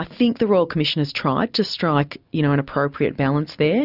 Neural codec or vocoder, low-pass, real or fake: none; 5.4 kHz; real